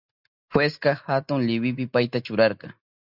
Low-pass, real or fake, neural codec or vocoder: 5.4 kHz; real; none